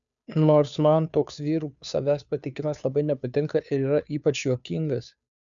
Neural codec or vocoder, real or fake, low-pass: codec, 16 kHz, 2 kbps, FunCodec, trained on Chinese and English, 25 frames a second; fake; 7.2 kHz